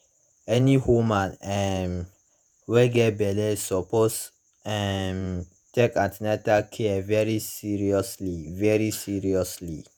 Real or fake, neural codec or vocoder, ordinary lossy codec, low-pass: fake; vocoder, 48 kHz, 128 mel bands, Vocos; none; none